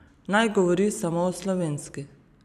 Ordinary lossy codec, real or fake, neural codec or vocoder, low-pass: none; fake; vocoder, 44.1 kHz, 128 mel bands every 256 samples, BigVGAN v2; 14.4 kHz